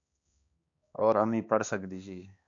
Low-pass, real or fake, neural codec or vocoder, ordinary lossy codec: 7.2 kHz; fake; codec, 16 kHz, 2 kbps, X-Codec, HuBERT features, trained on balanced general audio; AAC, 64 kbps